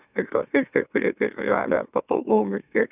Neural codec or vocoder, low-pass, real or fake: autoencoder, 44.1 kHz, a latent of 192 numbers a frame, MeloTTS; 3.6 kHz; fake